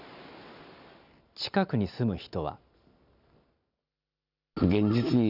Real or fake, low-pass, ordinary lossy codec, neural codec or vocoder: real; 5.4 kHz; none; none